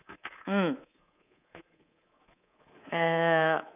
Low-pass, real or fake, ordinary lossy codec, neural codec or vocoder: 3.6 kHz; fake; none; codec, 24 kHz, 3.1 kbps, DualCodec